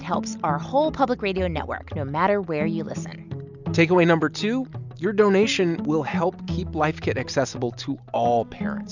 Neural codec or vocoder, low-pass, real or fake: none; 7.2 kHz; real